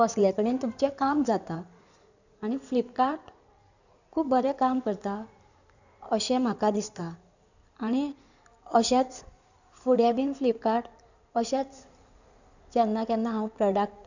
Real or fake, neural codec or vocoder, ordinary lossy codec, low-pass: fake; codec, 16 kHz in and 24 kHz out, 2.2 kbps, FireRedTTS-2 codec; none; 7.2 kHz